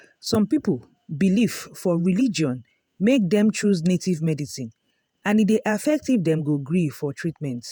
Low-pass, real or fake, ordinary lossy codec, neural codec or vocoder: none; fake; none; vocoder, 48 kHz, 128 mel bands, Vocos